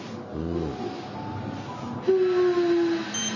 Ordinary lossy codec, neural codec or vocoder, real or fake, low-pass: none; none; real; 7.2 kHz